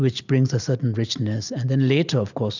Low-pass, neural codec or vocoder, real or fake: 7.2 kHz; none; real